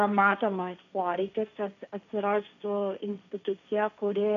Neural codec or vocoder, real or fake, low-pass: codec, 16 kHz, 1.1 kbps, Voila-Tokenizer; fake; 7.2 kHz